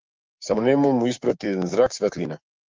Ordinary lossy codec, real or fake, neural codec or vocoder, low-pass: Opus, 32 kbps; fake; codec, 16 kHz, 6 kbps, DAC; 7.2 kHz